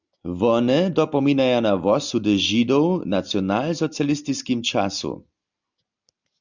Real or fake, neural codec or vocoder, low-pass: real; none; 7.2 kHz